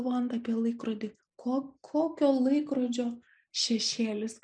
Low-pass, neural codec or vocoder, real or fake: 9.9 kHz; none; real